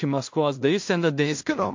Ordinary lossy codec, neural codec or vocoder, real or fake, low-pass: MP3, 48 kbps; codec, 16 kHz in and 24 kHz out, 0.4 kbps, LongCat-Audio-Codec, two codebook decoder; fake; 7.2 kHz